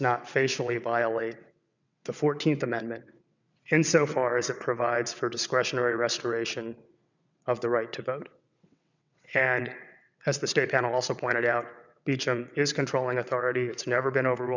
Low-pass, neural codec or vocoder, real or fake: 7.2 kHz; vocoder, 22.05 kHz, 80 mel bands, WaveNeXt; fake